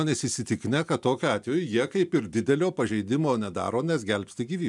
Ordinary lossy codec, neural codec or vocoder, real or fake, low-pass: MP3, 96 kbps; none; real; 10.8 kHz